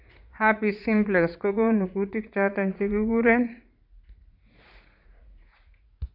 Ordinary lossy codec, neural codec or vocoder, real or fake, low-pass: none; vocoder, 44.1 kHz, 80 mel bands, Vocos; fake; 5.4 kHz